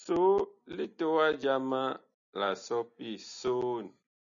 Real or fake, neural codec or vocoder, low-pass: real; none; 7.2 kHz